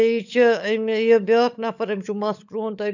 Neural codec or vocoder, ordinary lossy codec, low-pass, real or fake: codec, 16 kHz, 16 kbps, FunCodec, trained on LibriTTS, 50 frames a second; none; 7.2 kHz; fake